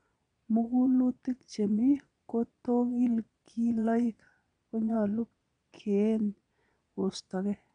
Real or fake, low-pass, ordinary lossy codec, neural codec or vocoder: fake; 9.9 kHz; none; vocoder, 22.05 kHz, 80 mel bands, Vocos